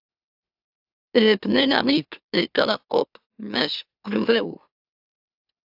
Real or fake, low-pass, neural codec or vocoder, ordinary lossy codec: fake; 5.4 kHz; autoencoder, 44.1 kHz, a latent of 192 numbers a frame, MeloTTS; AAC, 48 kbps